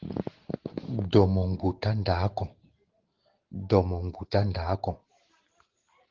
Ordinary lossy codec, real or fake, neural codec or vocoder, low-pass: Opus, 16 kbps; real; none; 7.2 kHz